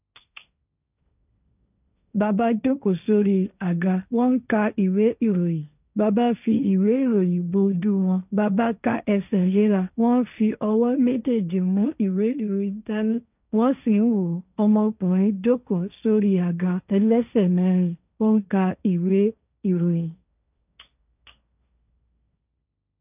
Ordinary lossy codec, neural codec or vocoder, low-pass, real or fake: none; codec, 16 kHz, 1.1 kbps, Voila-Tokenizer; 3.6 kHz; fake